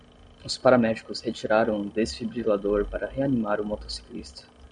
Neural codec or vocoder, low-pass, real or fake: none; 9.9 kHz; real